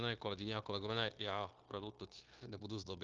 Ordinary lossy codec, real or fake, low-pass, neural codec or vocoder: Opus, 24 kbps; fake; 7.2 kHz; codec, 16 kHz, 0.9 kbps, LongCat-Audio-Codec